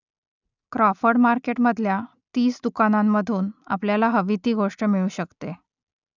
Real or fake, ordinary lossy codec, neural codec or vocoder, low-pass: real; none; none; 7.2 kHz